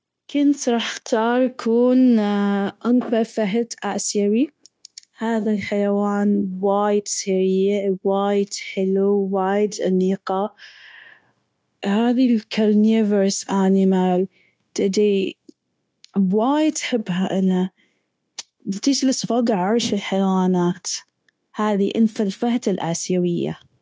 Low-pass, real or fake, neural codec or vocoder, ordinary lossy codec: none; fake; codec, 16 kHz, 0.9 kbps, LongCat-Audio-Codec; none